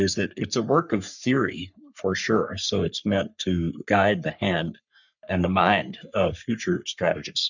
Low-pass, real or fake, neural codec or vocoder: 7.2 kHz; fake; codec, 44.1 kHz, 3.4 kbps, Pupu-Codec